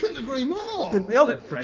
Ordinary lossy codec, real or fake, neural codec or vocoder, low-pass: Opus, 24 kbps; fake; codec, 16 kHz in and 24 kHz out, 1.1 kbps, FireRedTTS-2 codec; 7.2 kHz